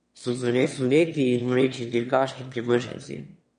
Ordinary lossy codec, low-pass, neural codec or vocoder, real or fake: MP3, 48 kbps; 9.9 kHz; autoencoder, 22.05 kHz, a latent of 192 numbers a frame, VITS, trained on one speaker; fake